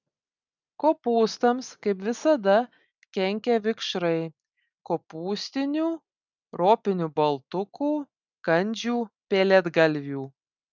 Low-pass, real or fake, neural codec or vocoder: 7.2 kHz; real; none